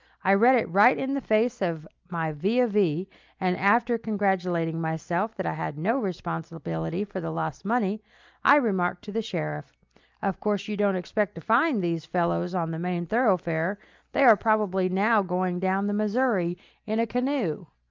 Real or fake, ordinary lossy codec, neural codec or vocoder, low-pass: real; Opus, 24 kbps; none; 7.2 kHz